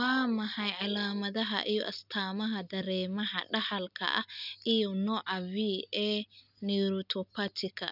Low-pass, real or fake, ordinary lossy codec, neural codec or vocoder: 5.4 kHz; real; none; none